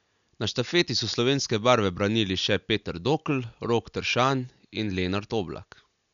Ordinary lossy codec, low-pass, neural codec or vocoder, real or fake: none; 7.2 kHz; none; real